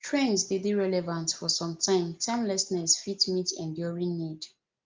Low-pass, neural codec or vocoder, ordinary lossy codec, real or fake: 7.2 kHz; none; Opus, 32 kbps; real